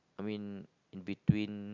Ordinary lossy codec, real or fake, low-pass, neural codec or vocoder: none; real; 7.2 kHz; none